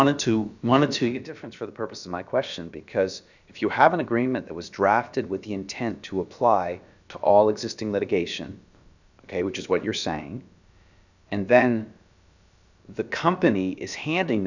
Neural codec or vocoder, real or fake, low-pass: codec, 16 kHz, about 1 kbps, DyCAST, with the encoder's durations; fake; 7.2 kHz